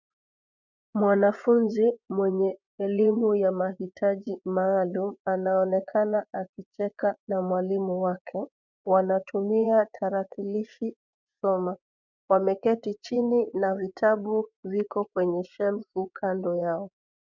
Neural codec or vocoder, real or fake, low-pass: vocoder, 24 kHz, 100 mel bands, Vocos; fake; 7.2 kHz